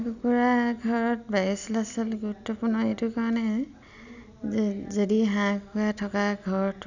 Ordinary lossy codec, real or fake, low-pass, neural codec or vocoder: none; real; 7.2 kHz; none